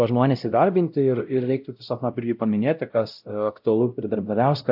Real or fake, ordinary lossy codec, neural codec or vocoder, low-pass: fake; AAC, 48 kbps; codec, 16 kHz, 0.5 kbps, X-Codec, WavLM features, trained on Multilingual LibriSpeech; 5.4 kHz